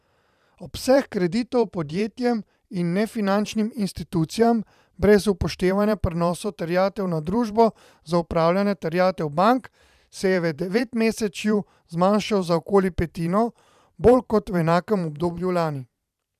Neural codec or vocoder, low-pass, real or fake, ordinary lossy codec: vocoder, 44.1 kHz, 128 mel bands every 512 samples, BigVGAN v2; 14.4 kHz; fake; none